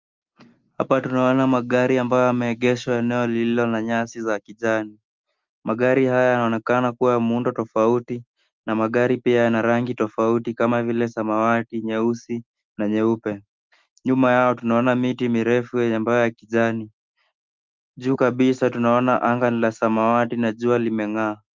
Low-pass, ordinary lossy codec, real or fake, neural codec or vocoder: 7.2 kHz; Opus, 32 kbps; real; none